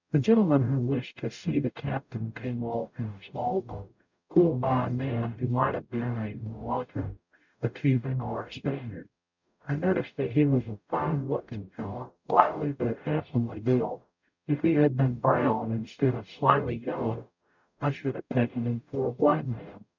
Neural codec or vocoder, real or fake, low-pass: codec, 44.1 kHz, 0.9 kbps, DAC; fake; 7.2 kHz